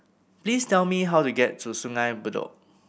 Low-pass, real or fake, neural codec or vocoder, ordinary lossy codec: none; real; none; none